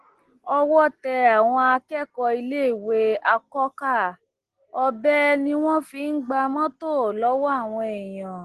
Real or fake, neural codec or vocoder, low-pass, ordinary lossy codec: real; none; 10.8 kHz; Opus, 16 kbps